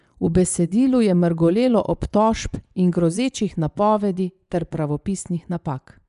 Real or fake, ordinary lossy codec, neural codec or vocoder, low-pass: fake; none; vocoder, 24 kHz, 100 mel bands, Vocos; 10.8 kHz